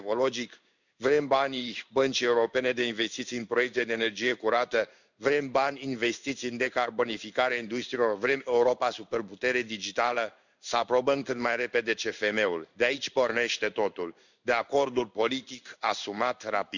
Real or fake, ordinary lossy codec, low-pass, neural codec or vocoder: fake; none; 7.2 kHz; codec, 16 kHz in and 24 kHz out, 1 kbps, XY-Tokenizer